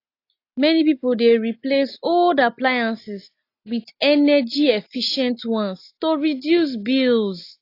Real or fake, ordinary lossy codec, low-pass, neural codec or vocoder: real; AAC, 32 kbps; 5.4 kHz; none